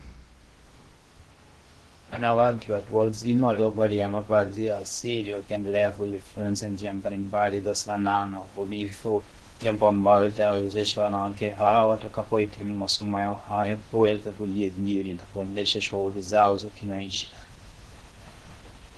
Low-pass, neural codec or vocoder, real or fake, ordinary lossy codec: 10.8 kHz; codec, 16 kHz in and 24 kHz out, 0.6 kbps, FocalCodec, streaming, 2048 codes; fake; Opus, 16 kbps